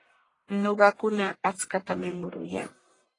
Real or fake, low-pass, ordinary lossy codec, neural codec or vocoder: fake; 10.8 kHz; AAC, 32 kbps; codec, 44.1 kHz, 1.7 kbps, Pupu-Codec